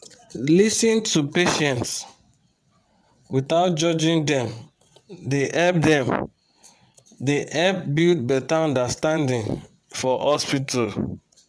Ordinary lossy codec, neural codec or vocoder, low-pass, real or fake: none; vocoder, 22.05 kHz, 80 mel bands, WaveNeXt; none; fake